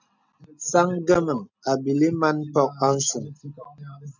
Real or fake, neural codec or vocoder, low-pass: real; none; 7.2 kHz